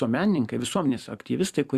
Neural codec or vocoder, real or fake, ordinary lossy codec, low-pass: vocoder, 44.1 kHz, 128 mel bands every 256 samples, BigVGAN v2; fake; Opus, 64 kbps; 14.4 kHz